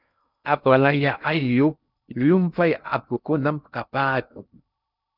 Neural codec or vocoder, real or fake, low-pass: codec, 16 kHz in and 24 kHz out, 0.6 kbps, FocalCodec, streaming, 2048 codes; fake; 5.4 kHz